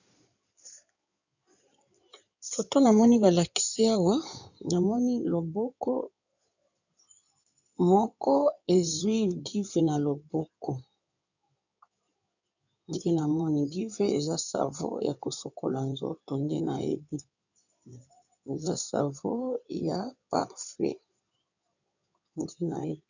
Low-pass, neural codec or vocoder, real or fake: 7.2 kHz; codec, 16 kHz in and 24 kHz out, 2.2 kbps, FireRedTTS-2 codec; fake